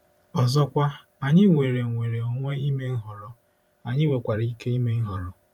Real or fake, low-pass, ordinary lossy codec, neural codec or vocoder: fake; 19.8 kHz; none; vocoder, 44.1 kHz, 128 mel bands every 512 samples, BigVGAN v2